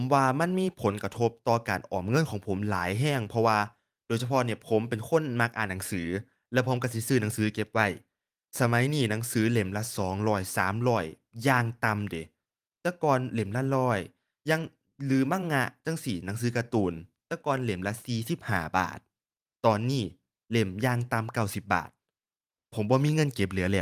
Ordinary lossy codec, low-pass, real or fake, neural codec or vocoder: Opus, 32 kbps; 14.4 kHz; fake; vocoder, 44.1 kHz, 128 mel bands every 512 samples, BigVGAN v2